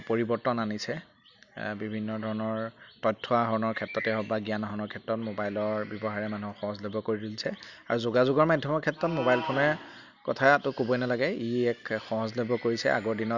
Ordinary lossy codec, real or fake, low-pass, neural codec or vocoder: Opus, 64 kbps; real; 7.2 kHz; none